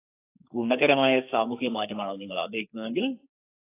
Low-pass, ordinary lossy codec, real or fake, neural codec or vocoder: 3.6 kHz; AAC, 24 kbps; fake; codec, 44.1 kHz, 2.6 kbps, SNAC